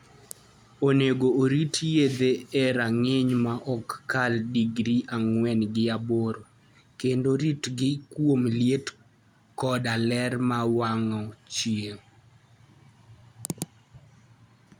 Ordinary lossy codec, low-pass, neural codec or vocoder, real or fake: none; 19.8 kHz; none; real